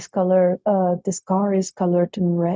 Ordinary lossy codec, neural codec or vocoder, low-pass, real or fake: none; codec, 16 kHz, 0.4 kbps, LongCat-Audio-Codec; none; fake